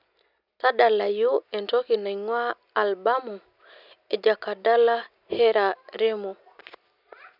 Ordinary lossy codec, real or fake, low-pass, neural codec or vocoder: none; real; 5.4 kHz; none